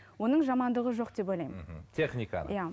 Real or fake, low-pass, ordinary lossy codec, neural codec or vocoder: real; none; none; none